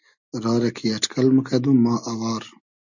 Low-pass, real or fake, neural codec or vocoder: 7.2 kHz; real; none